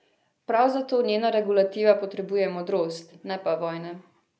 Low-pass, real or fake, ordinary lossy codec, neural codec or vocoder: none; real; none; none